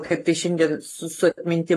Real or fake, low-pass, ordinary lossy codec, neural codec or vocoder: fake; 14.4 kHz; AAC, 48 kbps; codec, 44.1 kHz, 7.8 kbps, Pupu-Codec